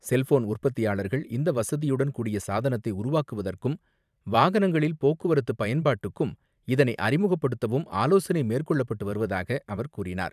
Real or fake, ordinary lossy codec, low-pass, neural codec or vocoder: fake; none; 14.4 kHz; vocoder, 44.1 kHz, 128 mel bands every 512 samples, BigVGAN v2